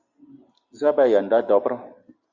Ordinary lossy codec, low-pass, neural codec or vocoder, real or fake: Opus, 64 kbps; 7.2 kHz; none; real